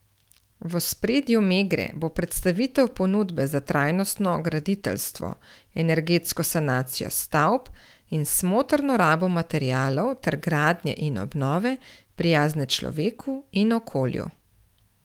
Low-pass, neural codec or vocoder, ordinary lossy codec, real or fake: 19.8 kHz; autoencoder, 48 kHz, 128 numbers a frame, DAC-VAE, trained on Japanese speech; Opus, 24 kbps; fake